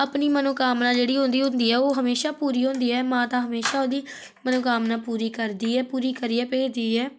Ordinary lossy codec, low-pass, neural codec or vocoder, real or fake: none; none; none; real